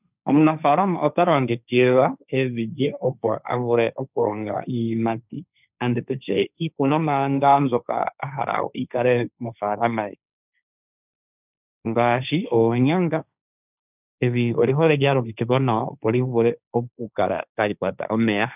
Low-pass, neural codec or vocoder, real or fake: 3.6 kHz; codec, 16 kHz, 1.1 kbps, Voila-Tokenizer; fake